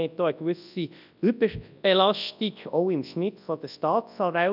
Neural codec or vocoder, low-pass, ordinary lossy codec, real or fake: codec, 24 kHz, 0.9 kbps, WavTokenizer, large speech release; 5.4 kHz; none; fake